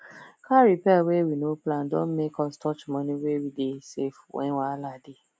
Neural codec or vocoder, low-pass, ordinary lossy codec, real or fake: none; none; none; real